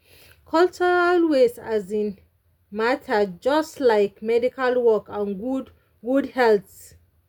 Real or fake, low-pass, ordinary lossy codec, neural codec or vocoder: real; 19.8 kHz; none; none